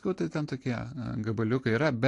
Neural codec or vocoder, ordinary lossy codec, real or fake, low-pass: none; AAC, 48 kbps; real; 10.8 kHz